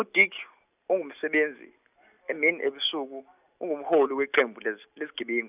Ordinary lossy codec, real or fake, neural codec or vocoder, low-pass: none; real; none; 3.6 kHz